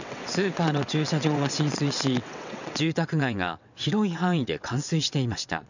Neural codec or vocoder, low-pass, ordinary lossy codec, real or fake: vocoder, 22.05 kHz, 80 mel bands, WaveNeXt; 7.2 kHz; none; fake